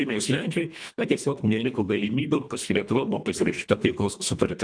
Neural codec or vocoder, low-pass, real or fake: codec, 24 kHz, 1.5 kbps, HILCodec; 9.9 kHz; fake